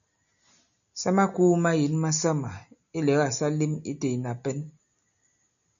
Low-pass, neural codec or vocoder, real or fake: 7.2 kHz; none; real